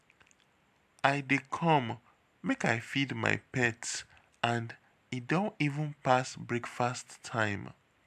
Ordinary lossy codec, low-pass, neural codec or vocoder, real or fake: AAC, 96 kbps; 14.4 kHz; none; real